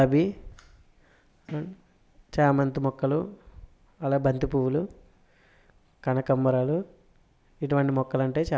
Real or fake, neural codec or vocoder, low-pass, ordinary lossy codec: real; none; none; none